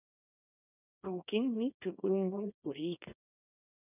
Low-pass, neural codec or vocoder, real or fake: 3.6 kHz; codec, 24 kHz, 0.9 kbps, WavTokenizer, small release; fake